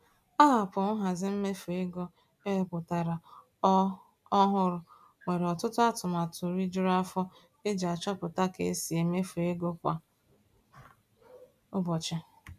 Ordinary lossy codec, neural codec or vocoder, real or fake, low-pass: none; none; real; 14.4 kHz